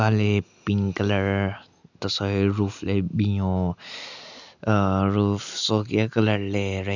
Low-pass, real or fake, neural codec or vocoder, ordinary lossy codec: 7.2 kHz; real; none; none